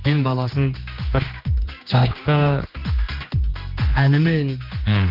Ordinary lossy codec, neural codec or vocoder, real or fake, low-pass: Opus, 24 kbps; codec, 16 kHz, 2 kbps, X-Codec, HuBERT features, trained on general audio; fake; 5.4 kHz